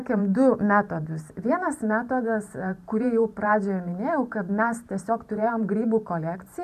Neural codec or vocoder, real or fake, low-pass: vocoder, 44.1 kHz, 128 mel bands every 512 samples, BigVGAN v2; fake; 14.4 kHz